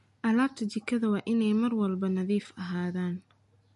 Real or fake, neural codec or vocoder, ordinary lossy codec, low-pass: real; none; MP3, 48 kbps; 10.8 kHz